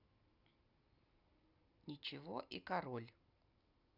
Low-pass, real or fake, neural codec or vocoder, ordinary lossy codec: 5.4 kHz; real; none; none